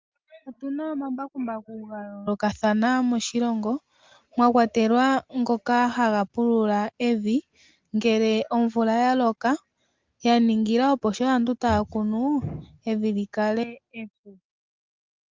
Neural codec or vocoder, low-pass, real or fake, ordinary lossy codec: none; 7.2 kHz; real; Opus, 32 kbps